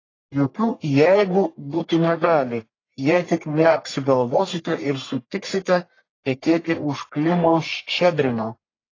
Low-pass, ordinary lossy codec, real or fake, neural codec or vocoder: 7.2 kHz; AAC, 32 kbps; fake; codec, 44.1 kHz, 1.7 kbps, Pupu-Codec